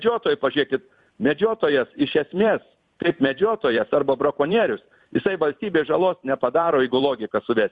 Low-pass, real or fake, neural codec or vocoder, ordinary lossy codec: 10.8 kHz; fake; vocoder, 44.1 kHz, 128 mel bands every 256 samples, BigVGAN v2; Opus, 64 kbps